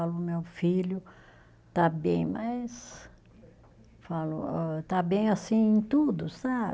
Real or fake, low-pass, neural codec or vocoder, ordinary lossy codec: real; none; none; none